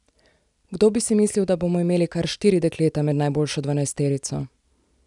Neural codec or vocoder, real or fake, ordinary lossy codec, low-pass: none; real; none; 10.8 kHz